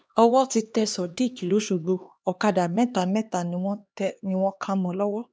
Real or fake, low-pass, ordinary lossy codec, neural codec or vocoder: fake; none; none; codec, 16 kHz, 2 kbps, X-Codec, HuBERT features, trained on LibriSpeech